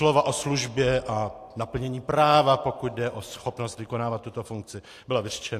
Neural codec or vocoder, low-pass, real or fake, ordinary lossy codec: none; 14.4 kHz; real; AAC, 64 kbps